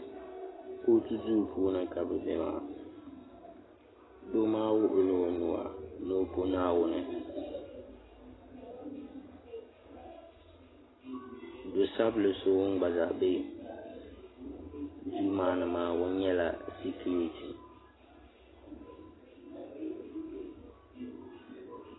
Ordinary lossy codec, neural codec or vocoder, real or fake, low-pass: AAC, 16 kbps; none; real; 7.2 kHz